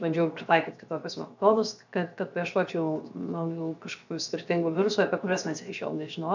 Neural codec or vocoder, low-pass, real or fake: codec, 16 kHz, 0.7 kbps, FocalCodec; 7.2 kHz; fake